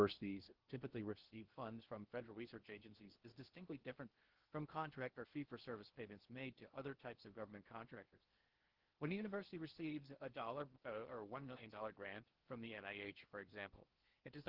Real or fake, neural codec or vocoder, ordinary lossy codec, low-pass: fake; codec, 16 kHz in and 24 kHz out, 0.6 kbps, FocalCodec, streaming, 4096 codes; Opus, 16 kbps; 5.4 kHz